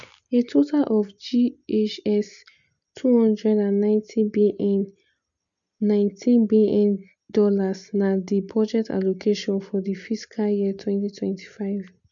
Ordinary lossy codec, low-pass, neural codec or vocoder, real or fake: none; 7.2 kHz; none; real